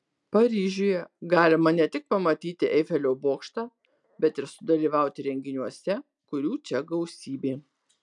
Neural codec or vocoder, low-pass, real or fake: none; 10.8 kHz; real